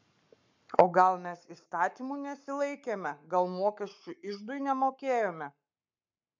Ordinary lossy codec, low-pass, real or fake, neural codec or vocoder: MP3, 64 kbps; 7.2 kHz; fake; codec, 44.1 kHz, 7.8 kbps, Pupu-Codec